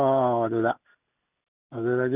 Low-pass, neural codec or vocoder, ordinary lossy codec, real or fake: 3.6 kHz; none; none; real